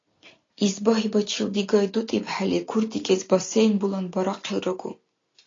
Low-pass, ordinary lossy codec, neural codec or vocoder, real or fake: 7.2 kHz; AAC, 32 kbps; none; real